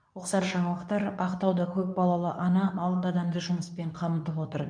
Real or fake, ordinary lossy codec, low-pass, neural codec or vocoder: fake; none; 9.9 kHz; codec, 24 kHz, 0.9 kbps, WavTokenizer, medium speech release version 2